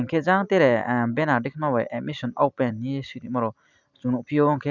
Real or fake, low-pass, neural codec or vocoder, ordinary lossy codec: real; 7.2 kHz; none; none